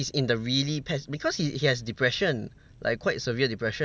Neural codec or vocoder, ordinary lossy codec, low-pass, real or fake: none; none; none; real